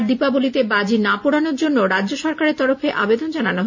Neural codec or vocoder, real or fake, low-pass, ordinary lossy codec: none; real; 7.2 kHz; none